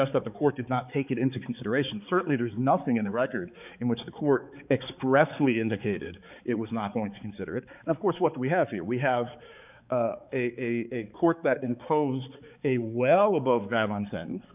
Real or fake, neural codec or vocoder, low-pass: fake; codec, 16 kHz, 4 kbps, X-Codec, HuBERT features, trained on balanced general audio; 3.6 kHz